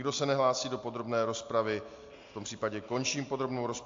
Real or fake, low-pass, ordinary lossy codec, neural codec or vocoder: real; 7.2 kHz; AAC, 48 kbps; none